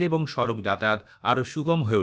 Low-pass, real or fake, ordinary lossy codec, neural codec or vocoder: none; fake; none; codec, 16 kHz, about 1 kbps, DyCAST, with the encoder's durations